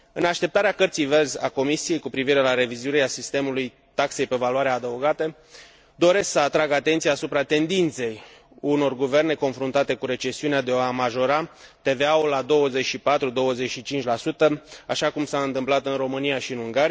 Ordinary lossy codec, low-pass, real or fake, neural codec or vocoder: none; none; real; none